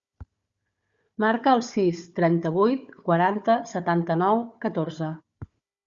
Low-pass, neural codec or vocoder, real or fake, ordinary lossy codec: 7.2 kHz; codec, 16 kHz, 4 kbps, FunCodec, trained on Chinese and English, 50 frames a second; fake; Opus, 64 kbps